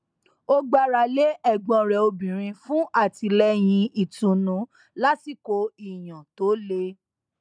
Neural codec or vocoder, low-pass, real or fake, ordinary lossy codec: none; 9.9 kHz; real; none